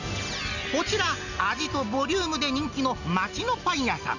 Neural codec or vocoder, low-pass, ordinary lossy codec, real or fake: none; 7.2 kHz; none; real